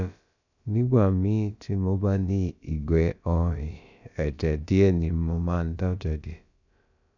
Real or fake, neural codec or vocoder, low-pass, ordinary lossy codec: fake; codec, 16 kHz, about 1 kbps, DyCAST, with the encoder's durations; 7.2 kHz; none